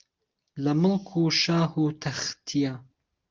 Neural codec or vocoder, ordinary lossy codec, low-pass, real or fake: none; Opus, 24 kbps; 7.2 kHz; real